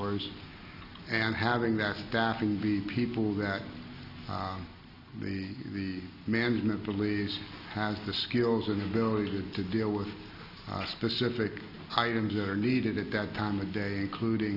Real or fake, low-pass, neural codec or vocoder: real; 5.4 kHz; none